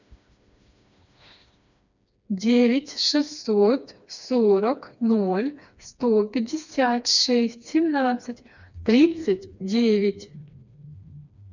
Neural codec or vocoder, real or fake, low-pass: codec, 16 kHz, 2 kbps, FreqCodec, smaller model; fake; 7.2 kHz